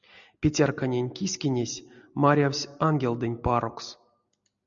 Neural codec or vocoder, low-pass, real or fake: none; 7.2 kHz; real